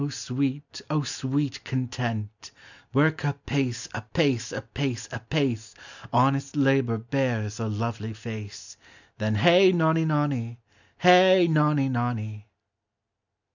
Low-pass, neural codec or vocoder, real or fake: 7.2 kHz; none; real